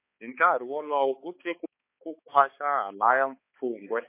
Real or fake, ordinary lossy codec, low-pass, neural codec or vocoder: fake; MP3, 24 kbps; 3.6 kHz; codec, 16 kHz, 4 kbps, X-Codec, HuBERT features, trained on balanced general audio